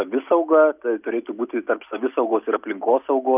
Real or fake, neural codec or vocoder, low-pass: real; none; 3.6 kHz